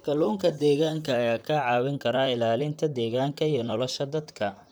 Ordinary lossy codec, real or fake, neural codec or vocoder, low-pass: none; fake; vocoder, 44.1 kHz, 128 mel bands, Pupu-Vocoder; none